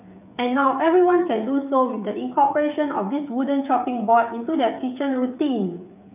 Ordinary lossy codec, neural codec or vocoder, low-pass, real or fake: none; codec, 16 kHz, 8 kbps, FreqCodec, smaller model; 3.6 kHz; fake